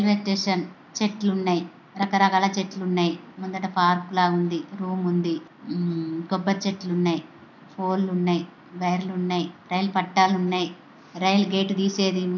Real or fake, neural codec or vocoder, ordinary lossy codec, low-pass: real; none; none; 7.2 kHz